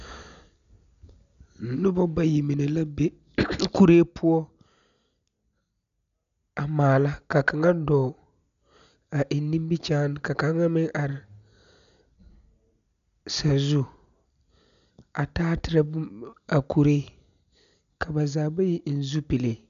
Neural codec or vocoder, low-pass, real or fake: none; 7.2 kHz; real